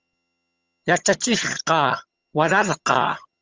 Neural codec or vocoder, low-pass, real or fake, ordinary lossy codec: vocoder, 22.05 kHz, 80 mel bands, HiFi-GAN; 7.2 kHz; fake; Opus, 32 kbps